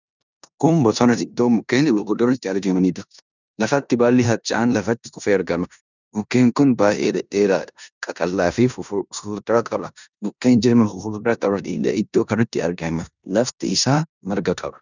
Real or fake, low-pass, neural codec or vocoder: fake; 7.2 kHz; codec, 16 kHz in and 24 kHz out, 0.9 kbps, LongCat-Audio-Codec, four codebook decoder